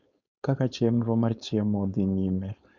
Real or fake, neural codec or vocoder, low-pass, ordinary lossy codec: fake; codec, 16 kHz, 4.8 kbps, FACodec; 7.2 kHz; none